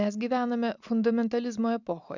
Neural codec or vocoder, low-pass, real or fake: none; 7.2 kHz; real